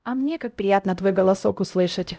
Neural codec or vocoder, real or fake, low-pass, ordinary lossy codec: codec, 16 kHz, 0.5 kbps, X-Codec, HuBERT features, trained on LibriSpeech; fake; none; none